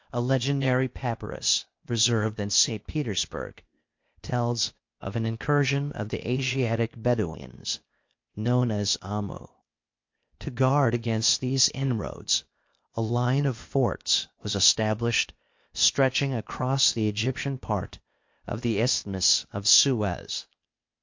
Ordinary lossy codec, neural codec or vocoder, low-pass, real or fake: MP3, 48 kbps; codec, 16 kHz, 0.8 kbps, ZipCodec; 7.2 kHz; fake